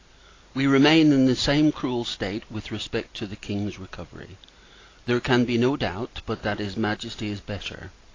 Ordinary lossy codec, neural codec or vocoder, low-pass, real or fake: AAC, 32 kbps; none; 7.2 kHz; real